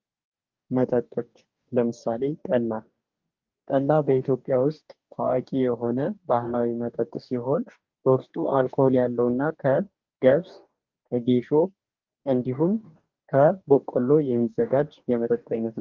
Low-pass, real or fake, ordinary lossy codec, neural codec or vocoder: 7.2 kHz; fake; Opus, 32 kbps; codec, 44.1 kHz, 2.6 kbps, DAC